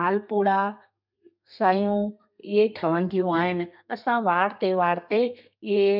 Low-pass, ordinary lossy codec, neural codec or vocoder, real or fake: 5.4 kHz; none; codec, 32 kHz, 1.9 kbps, SNAC; fake